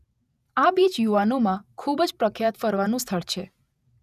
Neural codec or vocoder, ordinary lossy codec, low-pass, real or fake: vocoder, 48 kHz, 128 mel bands, Vocos; none; 14.4 kHz; fake